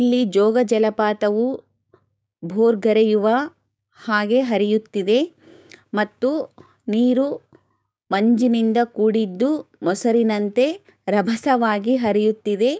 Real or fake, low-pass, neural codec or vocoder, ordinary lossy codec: fake; none; codec, 16 kHz, 6 kbps, DAC; none